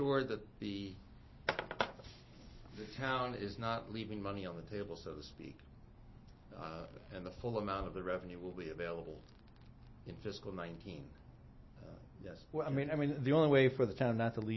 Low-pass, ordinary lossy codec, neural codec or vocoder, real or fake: 7.2 kHz; MP3, 24 kbps; none; real